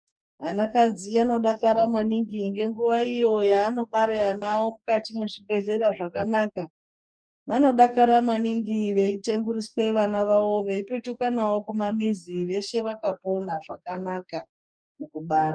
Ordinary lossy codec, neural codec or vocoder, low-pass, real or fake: MP3, 96 kbps; codec, 44.1 kHz, 2.6 kbps, DAC; 9.9 kHz; fake